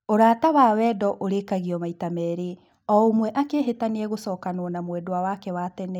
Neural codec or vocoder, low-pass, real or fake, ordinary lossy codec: none; 19.8 kHz; real; none